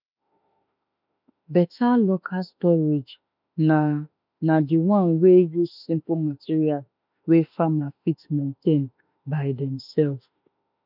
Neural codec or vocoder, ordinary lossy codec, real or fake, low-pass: autoencoder, 48 kHz, 32 numbers a frame, DAC-VAE, trained on Japanese speech; none; fake; 5.4 kHz